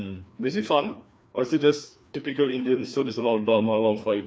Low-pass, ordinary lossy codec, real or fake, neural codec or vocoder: none; none; fake; codec, 16 kHz, 2 kbps, FreqCodec, larger model